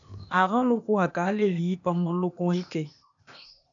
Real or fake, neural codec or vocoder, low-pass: fake; codec, 16 kHz, 0.8 kbps, ZipCodec; 7.2 kHz